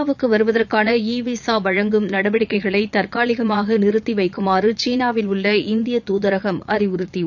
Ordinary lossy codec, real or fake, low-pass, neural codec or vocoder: none; fake; 7.2 kHz; vocoder, 22.05 kHz, 80 mel bands, Vocos